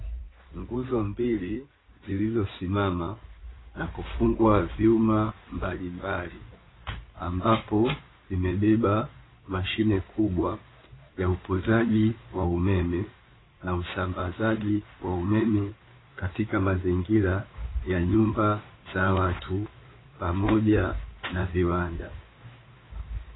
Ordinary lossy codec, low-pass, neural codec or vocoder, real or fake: AAC, 16 kbps; 7.2 kHz; codec, 16 kHz in and 24 kHz out, 2.2 kbps, FireRedTTS-2 codec; fake